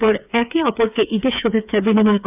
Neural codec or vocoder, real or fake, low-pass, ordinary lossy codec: codec, 16 kHz, 8 kbps, FreqCodec, smaller model; fake; 3.6 kHz; none